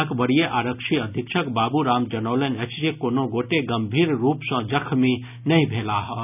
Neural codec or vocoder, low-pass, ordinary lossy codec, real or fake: none; 3.6 kHz; none; real